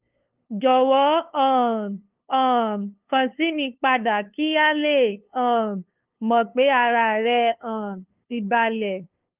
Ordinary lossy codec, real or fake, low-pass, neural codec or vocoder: Opus, 32 kbps; fake; 3.6 kHz; codec, 16 kHz, 2 kbps, FunCodec, trained on LibriTTS, 25 frames a second